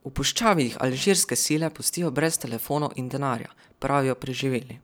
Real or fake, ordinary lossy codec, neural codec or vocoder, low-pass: fake; none; vocoder, 44.1 kHz, 128 mel bands every 256 samples, BigVGAN v2; none